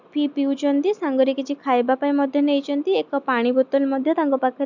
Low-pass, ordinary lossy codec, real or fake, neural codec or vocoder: 7.2 kHz; none; real; none